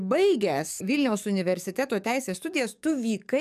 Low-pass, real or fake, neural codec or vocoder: 14.4 kHz; fake; codec, 44.1 kHz, 7.8 kbps, DAC